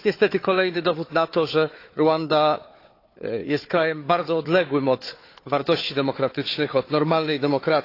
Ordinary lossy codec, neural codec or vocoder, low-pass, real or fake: AAC, 32 kbps; codec, 16 kHz, 4 kbps, FunCodec, trained on Chinese and English, 50 frames a second; 5.4 kHz; fake